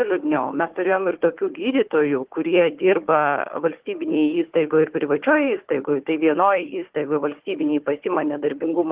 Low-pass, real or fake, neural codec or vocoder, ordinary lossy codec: 3.6 kHz; fake; codec, 16 kHz, 4 kbps, FunCodec, trained on Chinese and English, 50 frames a second; Opus, 16 kbps